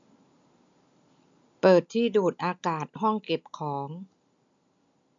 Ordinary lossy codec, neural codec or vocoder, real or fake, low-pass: MP3, 64 kbps; none; real; 7.2 kHz